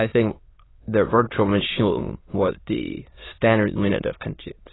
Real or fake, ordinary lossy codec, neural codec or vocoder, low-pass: fake; AAC, 16 kbps; autoencoder, 22.05 kHz, a latent of 192 numbers a frame, VITS, trained on many speakers; 7.2 kHz